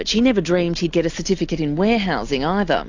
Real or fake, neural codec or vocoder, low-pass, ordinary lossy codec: real; none; 7.2 kHz; AAC, 48 kbps